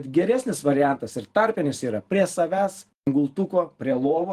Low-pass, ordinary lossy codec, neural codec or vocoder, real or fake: 14.4 kHz; Opus, 24 kbps; vocoder, 44.1 kHz, 128 mel bands every 512 samples, BigVGAN v2; fake